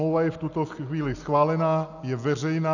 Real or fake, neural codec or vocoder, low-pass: real; none; 7.2 kHz